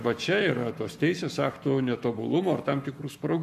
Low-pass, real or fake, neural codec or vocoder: 14.4 kHz; fake; codec, 44.1 kHz, 7.8 kbps, Pupu-Codec